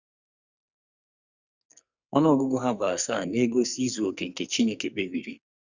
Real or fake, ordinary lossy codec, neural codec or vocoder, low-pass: fake; Opus, 64 kbps; codec, 44.1 kHz, 2.6 kbps, SNAC; 7.2 kHz